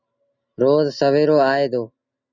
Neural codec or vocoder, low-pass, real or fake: none; 7.2 kHz; real